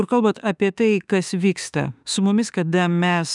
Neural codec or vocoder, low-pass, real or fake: autoencoder, 48 kHz, 32 numbers a frame, DAC-VAE, trained on Japanese speech; 10.8 kHz; fake